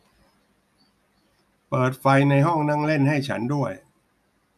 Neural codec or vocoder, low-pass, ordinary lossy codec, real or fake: none; 14.4 kHz; none; real